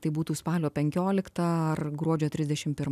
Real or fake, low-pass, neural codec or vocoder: real; 14.4 kHz; none